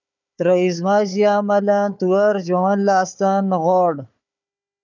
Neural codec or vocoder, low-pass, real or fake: codec, 16 kHz, 4 kbps, FunCodec, trained on Chinese and English, 50 frames a second; 7.2 kHz; fake